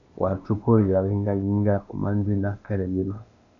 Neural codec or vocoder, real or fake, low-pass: codec, 16 kHz, 0.8 kbps, ZipCodec; fake; 7.2 kHz